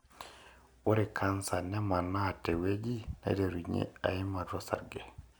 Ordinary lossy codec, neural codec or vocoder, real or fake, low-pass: none; none; real; none